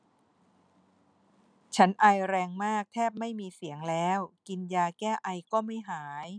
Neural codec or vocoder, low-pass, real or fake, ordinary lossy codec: none; 9.9 kHz; real; none